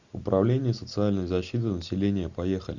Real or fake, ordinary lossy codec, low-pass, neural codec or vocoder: real; Opus, 64 kbps; 7.2 kHz; none